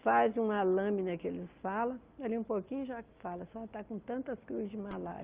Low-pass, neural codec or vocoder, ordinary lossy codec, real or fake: 3.6 kHz; none; Opus, 24 kbps; real